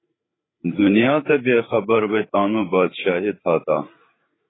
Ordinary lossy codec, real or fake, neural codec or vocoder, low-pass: AAC, 16 kbps; fake; codec, 16 kHz, 8 kbps, FreqCodec, larger model; 7.2 kHz